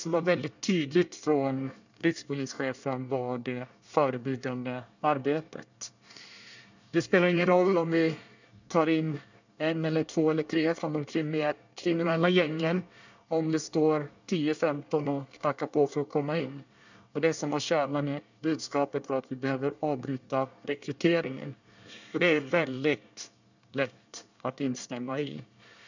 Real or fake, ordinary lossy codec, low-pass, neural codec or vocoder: fake; none; 7.2 kHz; codec, 24 kHz, 1 kbps, SNAC